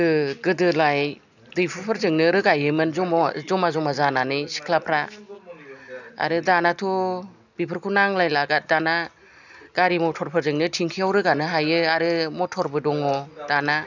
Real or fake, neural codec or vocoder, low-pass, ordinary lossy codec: real; none; 7.2 kHz; none